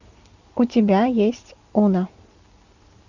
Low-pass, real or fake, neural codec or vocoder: 7.2 kHz; real; none